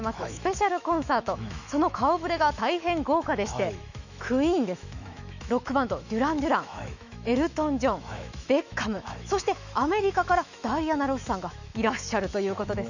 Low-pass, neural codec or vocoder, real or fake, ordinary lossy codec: 7.2 kHz; autoencoder, 48 kHz, 128 numbers a frame, DAC-VAE, trained on Japanese speech; fake; none